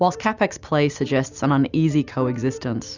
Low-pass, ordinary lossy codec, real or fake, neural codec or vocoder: 7.2 kHz; Opus, 64 kbps; real; none